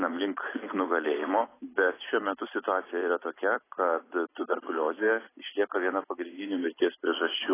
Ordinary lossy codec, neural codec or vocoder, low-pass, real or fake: AAC, 16 kbps; vocoder, 44.1 kHz, 128 mel bands every 256 samples, BigVGAN v2; 3.6 kHz; fake